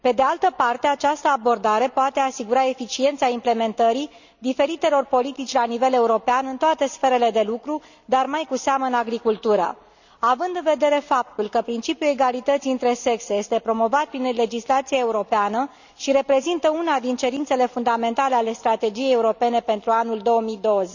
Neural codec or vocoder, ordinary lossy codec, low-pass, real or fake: none; none; 7.2 kHz; real